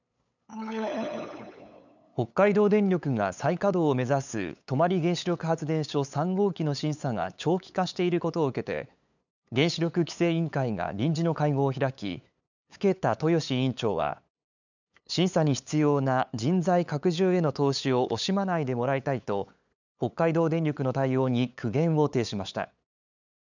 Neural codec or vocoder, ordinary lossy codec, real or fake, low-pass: codec, 16 kHz, 8 kbps, FunCodec, trained on LibriTTS, 25 frames a second; none; fake; 7.2 kHz